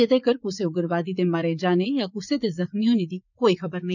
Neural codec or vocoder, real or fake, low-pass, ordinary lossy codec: vocoder, 44.1 kHz, 80 mel bands, Vocos; fake; 7.2 kHz; none